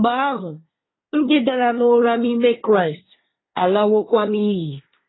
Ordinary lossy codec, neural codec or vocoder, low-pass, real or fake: AAC, 16 kbps; codec, 24 kHz, 1 kbps, SNAC; 7.2 kHz; fake